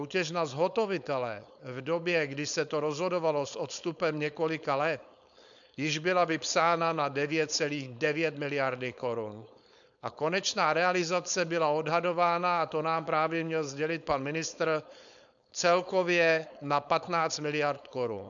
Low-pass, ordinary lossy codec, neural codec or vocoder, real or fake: 7.2 kHz; MP3, 96 kbps; codec, 16 kHz, 4.8 kbps, FACodec; fake